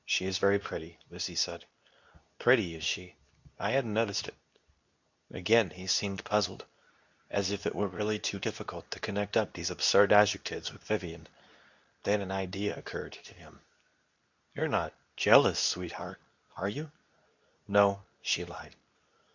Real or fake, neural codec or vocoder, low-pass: fake; codec, 24 kHz, 0.9 kbps, WavTokenizer, medium speech release version 2; 7.2 kHz